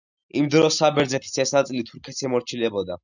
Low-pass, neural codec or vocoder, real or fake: 7.2 kHz; none; real